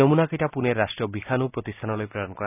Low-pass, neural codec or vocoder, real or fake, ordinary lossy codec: 3.6 kHz; none; real; none